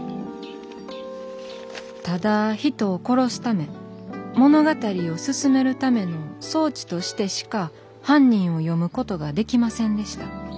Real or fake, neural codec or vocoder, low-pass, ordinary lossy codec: real; none; none; none